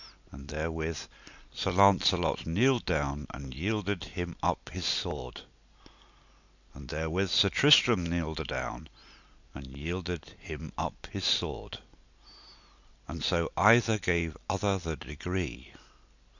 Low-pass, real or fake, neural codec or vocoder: 7.2 kHz; real; none